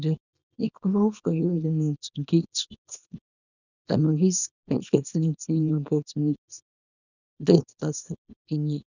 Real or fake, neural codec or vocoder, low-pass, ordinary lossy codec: fake; codec, 24 kHz, 0.9 kbps, WavTokenizer, small release; 7.2 kHz; none